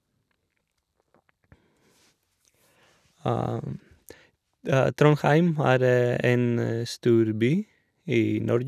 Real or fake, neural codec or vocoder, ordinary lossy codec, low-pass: real; none; none; 14.4 kHz